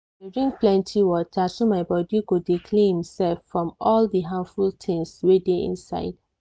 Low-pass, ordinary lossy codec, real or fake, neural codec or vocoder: none; none; real; none